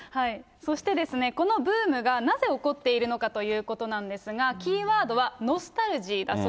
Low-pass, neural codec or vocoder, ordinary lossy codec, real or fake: none; none; none; real